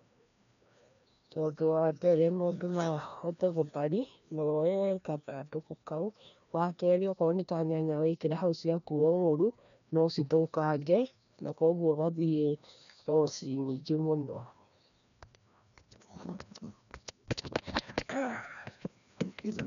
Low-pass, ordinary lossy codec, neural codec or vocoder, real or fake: 7.2 kHz; none; codec, 16 kHz, 1 kbps, FreqCodec, larger model; fake